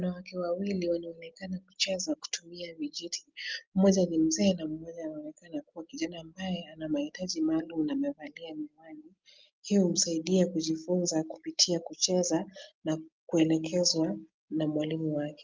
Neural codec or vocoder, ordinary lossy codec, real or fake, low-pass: none; Opus, 32 kbps; real; 7.2 kHz